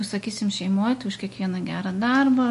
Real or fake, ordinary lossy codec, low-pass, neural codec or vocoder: real; MP3, 48 kbps; 14.4 kHz; none